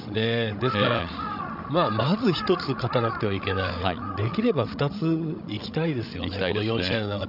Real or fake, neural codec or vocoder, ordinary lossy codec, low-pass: fake; codec, 16 kHz, 16 kbps, FreqCodec, larger model; none; 5.4 kHz